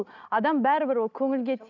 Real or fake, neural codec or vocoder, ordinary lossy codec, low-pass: real; none; none; 7.2 kHz